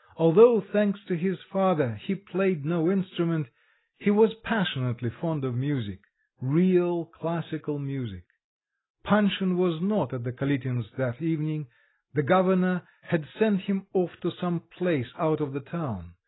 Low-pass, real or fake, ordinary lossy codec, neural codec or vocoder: 7.2 kHz; real; AAC, 16 kbps; none